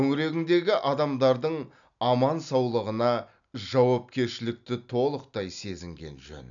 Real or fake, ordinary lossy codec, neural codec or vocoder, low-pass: real; none; none; 7.2 kHz